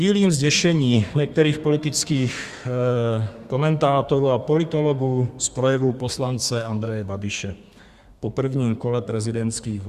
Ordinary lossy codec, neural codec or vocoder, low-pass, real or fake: Opus, 64 kbps; codec, 32 kHz, 1.9 kbps, SNAC; 14.4 kHz; fake